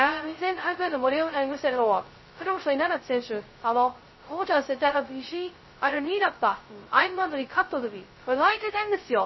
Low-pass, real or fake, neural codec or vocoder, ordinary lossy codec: 7.2 kHz; fake; codec, 16 kHz, 0.2 kbps, FocalCodec; MP3, 24 kbps